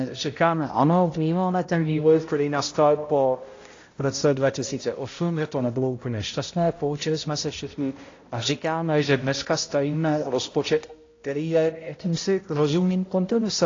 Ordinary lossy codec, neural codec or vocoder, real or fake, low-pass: AAC, 32 kbps; codec, 16 kHz, 0.5 kbps, X-Codec, HuBERT features, trained on balanced general audio; fake; 7.2 kHz